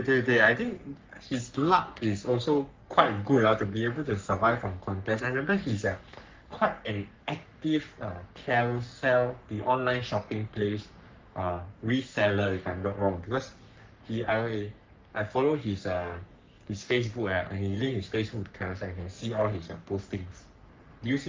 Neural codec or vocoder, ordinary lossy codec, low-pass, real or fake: codec, 44.1 kHz, 3.4 kbps, Pupu-Codec; Opus, 24 kbps; 7.2 kHz; fake